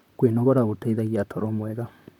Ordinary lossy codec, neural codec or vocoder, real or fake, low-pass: none; vocoder, 44.1 kHz, 128 mel bands, Pupu-Vocoder; fake; 19.8 kHz